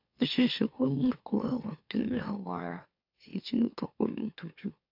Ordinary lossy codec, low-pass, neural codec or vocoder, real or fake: AAC, 48 kbps; 5.4 kHz; autoencoder, 44.1 kHz, a latent of 192 numbers a frame, MeloTTS; fake